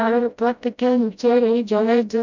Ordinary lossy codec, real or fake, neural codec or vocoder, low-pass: none; fake; codec, 16 kHz, 0.5 kbps, FreqCodec, smaller model; 7.2 kHz